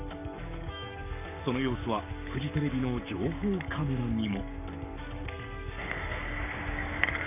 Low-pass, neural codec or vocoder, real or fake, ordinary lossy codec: 3.6 kHz; codec, 44.1 kHz, 7.8 kbps, Pupu-Codec; fake; none